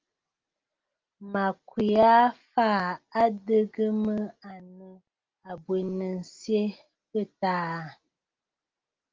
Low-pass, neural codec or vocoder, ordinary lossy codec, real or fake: 7.2 kHz; none; Opus, 32 kbps; real